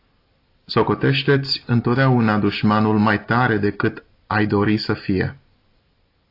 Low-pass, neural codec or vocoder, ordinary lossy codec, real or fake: 5.4 kHz; none; AAC, 32 kbps; real